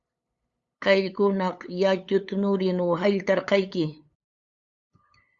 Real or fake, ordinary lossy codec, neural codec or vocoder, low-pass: fake; Opus, 64 kbps; codec, 16 kHz, 8 kbps, FunCodec, trained on LibriTTS, 25 frames a second; 7.2 kHz